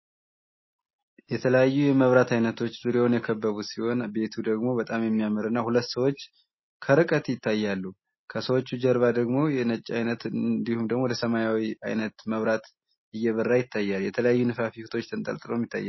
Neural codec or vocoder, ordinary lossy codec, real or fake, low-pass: none; MP3, 24 kbps; real; 7.2 kHz